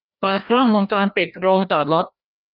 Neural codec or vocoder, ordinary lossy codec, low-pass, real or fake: codec, 16 kHz, 1 kbps, FreqCodec, larger model; none; 5.4 kHz; fake